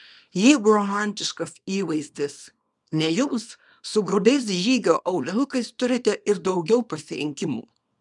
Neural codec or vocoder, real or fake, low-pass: codec, 24 kHz, 0.9 kbps, WavTokenizer, small release; fake; 10.8 kHz